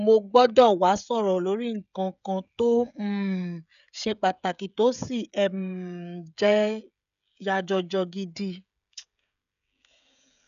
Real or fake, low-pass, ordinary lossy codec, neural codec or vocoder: fake; 7.2 kHz; none; codec, 16 kHz, 16 kbps, FreqCodec, smaller model